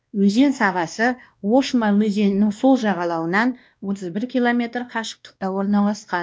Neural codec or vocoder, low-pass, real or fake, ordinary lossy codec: codec, 16 kHz, 1 kbps, X-Codec, WavLM features, trained on Multilingual LibriSpeech; none; fake; none